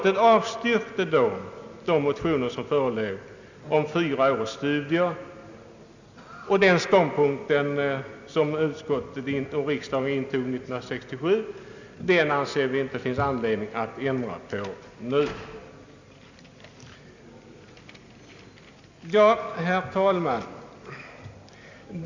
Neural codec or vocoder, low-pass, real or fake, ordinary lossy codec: none; 7.2 kHz; real; none